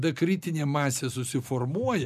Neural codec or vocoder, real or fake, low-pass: none; real; 14.4 kHz